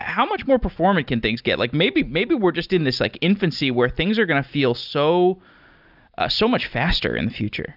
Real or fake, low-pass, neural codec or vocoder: real; 5.4 kHz; none